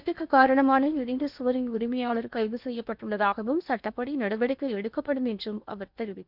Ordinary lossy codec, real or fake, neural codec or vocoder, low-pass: none; fake; codec, 16 kHz in and 24 kHz out, 0.8 kbps, FocalCodec, streaming, 65536 codes; 5.4 kHz